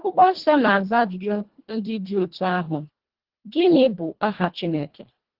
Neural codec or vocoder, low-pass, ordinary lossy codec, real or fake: codec, 24 kHz, 1.5 kbps, HILCodec; 5.4 kHz; Opus, 16 kbps; fake